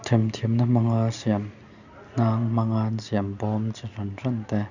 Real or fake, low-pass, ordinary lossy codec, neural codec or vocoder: real; 7.2 kHz; none; none